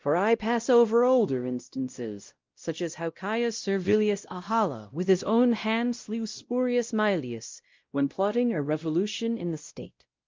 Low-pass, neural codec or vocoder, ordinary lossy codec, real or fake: 7.2 kHz; codec, 16 kHz, 0.5 kbps, X-Codec, WavLM features, trained on Multilingual LibriSpeech; Opus, 24 kbps; fake